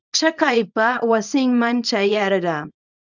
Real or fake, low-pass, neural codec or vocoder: fake; 7.2 kHz; codec, 24 kHz, 0.9 kbps, WavTokenizer, small release